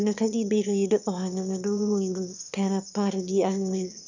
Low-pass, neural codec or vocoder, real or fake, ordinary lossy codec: 7.2 kHz; autoencoder, 22.05 kHz, a latent of 192 numbers a frame, VITS, trained on one speaker; fake; none